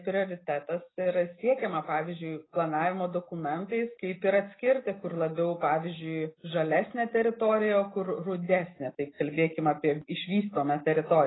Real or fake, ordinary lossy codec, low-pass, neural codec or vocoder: real; AAC, 16 kbps; 7.2 kHz; none